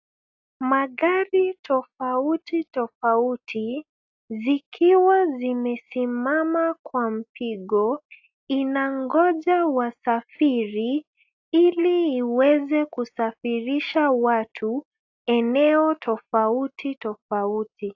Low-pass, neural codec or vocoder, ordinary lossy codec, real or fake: 7.2 kHz; none; AAC, 48 kbps; real